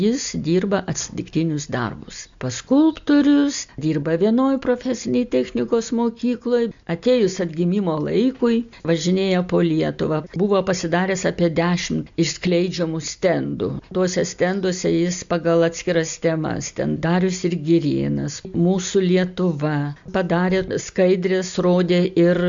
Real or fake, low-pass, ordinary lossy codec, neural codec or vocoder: real; 7.2 kHz; MP3, 64 kbps; none